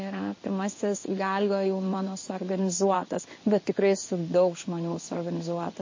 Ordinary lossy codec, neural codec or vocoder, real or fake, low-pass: MP3, 32 kbps; codec, 16 kHz in and 24 kHz out, 1 kbps, XY-Tokenizer; fake; 7.2 kHz